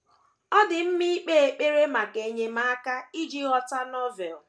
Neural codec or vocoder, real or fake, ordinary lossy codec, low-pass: none; real; none; none